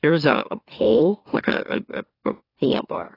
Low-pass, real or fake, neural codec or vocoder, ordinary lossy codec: 5.4 kHz; fake; autoencoder, 44.1 kHz, a latent of 192 numbers a frame, MeloTTS; AAC, 24 kbps